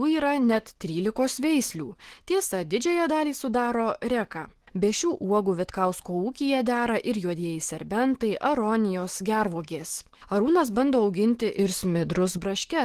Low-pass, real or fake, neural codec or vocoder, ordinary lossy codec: 14.4 kHz; fake; autoencoder, 48 kHz, 128 numbers a frame, DAC-VAE, trained on Japanese speech; Opus, 16 kbps